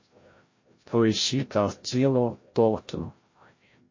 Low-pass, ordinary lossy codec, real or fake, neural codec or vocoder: 7.2 kHz; MP3, 32 kbps; fake; codec, 16 kHz, 0.5 kbps, FreqCodec, larger model